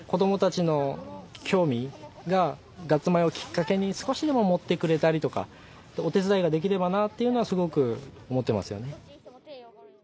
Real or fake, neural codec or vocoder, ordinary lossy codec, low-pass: real; none; none; none